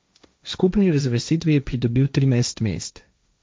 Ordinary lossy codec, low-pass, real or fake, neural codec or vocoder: none; none; fake; codec, 16 kHz, 1.1 kbps, Voila-Tokenizer